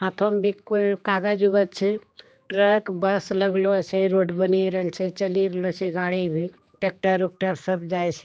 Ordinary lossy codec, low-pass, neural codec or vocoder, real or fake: none; none; codec, 16 kHz, 2 kbps, X-Codec, HuBERT features, trained on general audio; fake